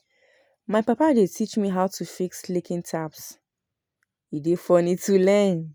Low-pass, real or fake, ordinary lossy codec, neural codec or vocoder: none; real; none; none